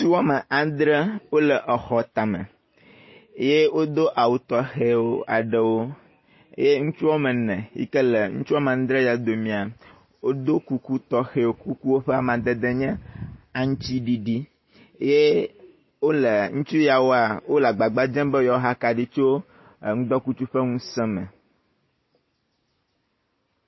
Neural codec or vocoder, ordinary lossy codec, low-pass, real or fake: none; MP3, 24 kbps; 7.2 kHz; real